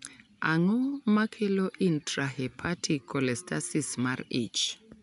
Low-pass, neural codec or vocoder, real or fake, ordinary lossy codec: 10.8 kHz; none; real; none